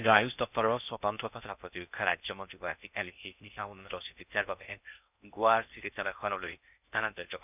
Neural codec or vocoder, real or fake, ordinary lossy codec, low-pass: codec, 16 kHz in and 24 kHz out, 0.6 kbps, FocalCodec, streaming, 4096 codes; fake; none; 3.6 kHz